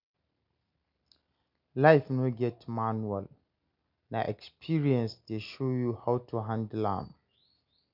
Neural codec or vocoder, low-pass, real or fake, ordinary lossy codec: none; 5.4 kHz; real; none